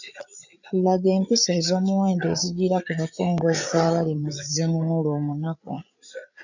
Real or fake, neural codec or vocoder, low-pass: fake; codec, 16 kHz, 8 kbps, FreqCodec, larger model; 7.2 kHz